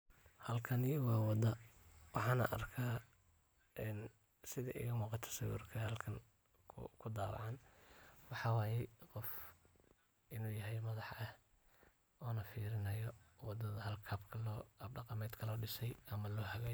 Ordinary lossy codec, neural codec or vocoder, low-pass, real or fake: none; none; none; real